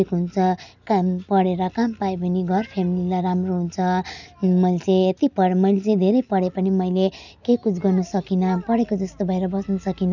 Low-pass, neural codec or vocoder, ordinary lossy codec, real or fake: 7.2 kHz; none; none; real